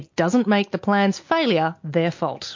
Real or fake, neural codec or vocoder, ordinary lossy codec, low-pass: real; none; MP3, 48 kbps; 7.2 kHz